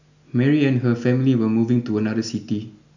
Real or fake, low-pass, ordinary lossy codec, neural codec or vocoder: real; 7.2 kHz; none; none